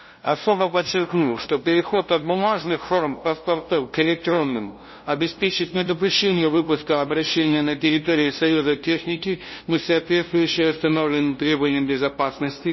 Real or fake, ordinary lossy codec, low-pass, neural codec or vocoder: fake; MP3, 24 kbps; 7.2 kHz; codec, 16 kHz, 0.5 kbps, FunCodec, trained on LibriTTS, 25 frames a second